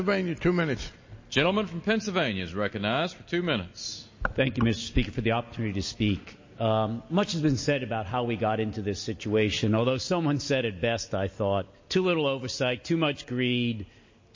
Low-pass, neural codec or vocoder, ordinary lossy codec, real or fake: 7.2 kHz; none; MP3, 32 kbps; real